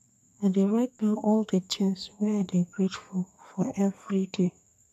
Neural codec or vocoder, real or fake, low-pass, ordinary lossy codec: codec, 32 kHz, 1.9 kbps, SNAC; fake; 14.4 kHz; none